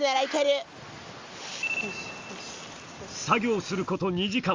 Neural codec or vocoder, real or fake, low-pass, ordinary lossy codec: none; real; 7.2 kHz; Opus, 32 kbps